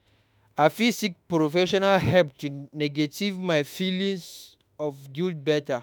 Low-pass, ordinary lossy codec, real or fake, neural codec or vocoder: none; none; fake; autoencoder, 48 kHz, 32 numbers a frame, DAC-VAE, trained on Japanese speech